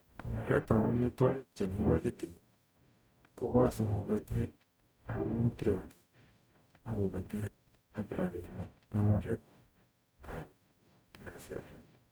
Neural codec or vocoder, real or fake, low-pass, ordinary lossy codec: codec, 44.1 kHz, 0.9 kbps, DAC; fake; none; none